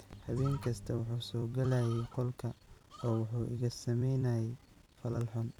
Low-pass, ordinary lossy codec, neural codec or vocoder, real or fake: 19.8 kHz; none; vocoder, 44.1 kHz, 128 mel bands every 256 samples, BigVGAN v2; fake